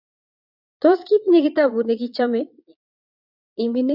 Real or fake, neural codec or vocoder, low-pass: fake; vocoder, 22.05 kHz, 80 mel bands, Vocos; 5.4 kHz